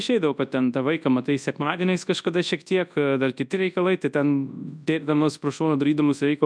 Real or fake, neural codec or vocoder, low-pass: fake; codec, 24 kHz, 0.9 kbps, WavTokenizer, large speech release; 9.9 kHz